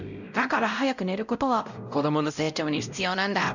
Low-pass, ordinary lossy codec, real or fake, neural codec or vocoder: 7.2 kHz; none; fake; codec, 16 kHz, 0.5 kbps, X-Codec, WavLM features, trained on Multilingual LibriSpeech